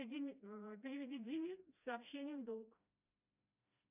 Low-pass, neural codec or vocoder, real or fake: 3.6 kHz; codec, 16 kHz, 1 kbps, FreqCodec, smaller model; fake